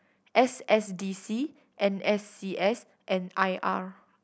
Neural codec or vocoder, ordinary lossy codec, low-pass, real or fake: none; none; none; real